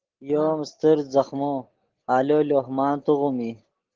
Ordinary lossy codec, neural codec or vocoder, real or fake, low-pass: Opus, 16 kbps; none; real; 7.2 kHz